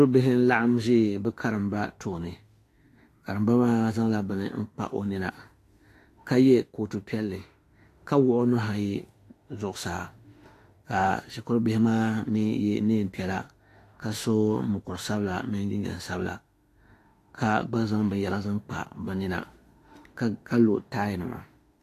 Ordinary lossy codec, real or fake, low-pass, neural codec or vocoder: AAC, 48 kbps; fake; 14.4 kHz; autoencoder, 48 kHz, 32 numbers a frame, DAC-VAE, trained on Japanese speech